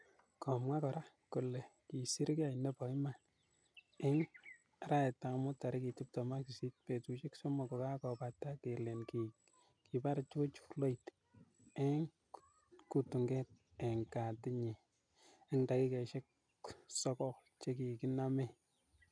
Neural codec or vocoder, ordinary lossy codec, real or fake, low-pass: none; none; real; 9.9 kHz